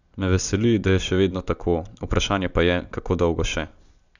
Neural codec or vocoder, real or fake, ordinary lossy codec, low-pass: none; real; none; 7.2 kHz